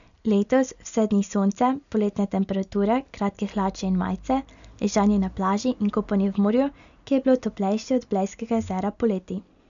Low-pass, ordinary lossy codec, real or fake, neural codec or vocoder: 7.2 kHz; none; real; none